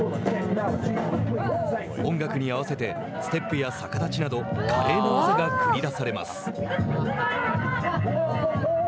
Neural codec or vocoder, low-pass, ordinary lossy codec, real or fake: none; none; none; real